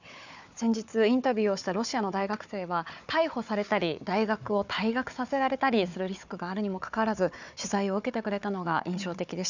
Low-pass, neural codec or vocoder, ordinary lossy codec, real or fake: 7.2 kHz; codec, 16 kHz, 4 kbps, FunCodec, trained on Chinese and English, 50 frames a second; none; fake